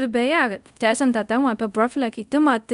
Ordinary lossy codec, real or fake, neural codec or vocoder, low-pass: MP3, 96 kbps; fake; codec, 24 kHz, 0.5 kbps, DualCodec; 10.8 kHz